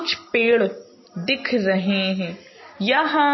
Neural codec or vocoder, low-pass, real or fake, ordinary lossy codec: none; 7.2 kHz; real; MP3, 24 kbps